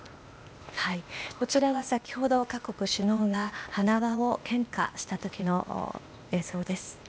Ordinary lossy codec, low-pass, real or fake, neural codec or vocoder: none; none; fake; codec, 16 kHz, 0.8 kbps, ZipCodec